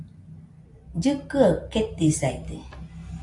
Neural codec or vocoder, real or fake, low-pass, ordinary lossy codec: none; real; 10.8 kHz; AAC, 64 kbps